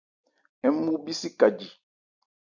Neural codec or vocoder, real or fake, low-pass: none; real; 7.2 kHz